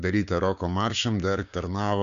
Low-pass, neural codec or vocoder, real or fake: 7.2 kHz; codec, 16 kHz, 6 kbps, DAC; fake